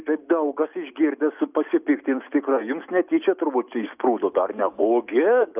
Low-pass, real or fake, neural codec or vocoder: 3.6 kHz; real; none